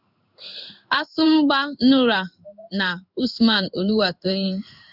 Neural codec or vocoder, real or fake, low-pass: codec, 16 kHz in and 24 kHz out, 1 kbps, XY-Tokenizer; fake; 5.4 kHz